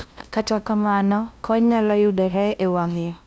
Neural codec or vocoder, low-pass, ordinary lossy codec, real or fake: codec, 16 kHz, 0.5 kbps, FunCodec, trained on LibriTTS, 25 frames a second; none; none; fake